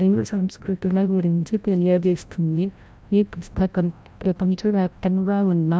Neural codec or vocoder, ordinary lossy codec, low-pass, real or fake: codec, 16 kHz, 0.5 kbps, FreqCodec, larger model; none; none; fake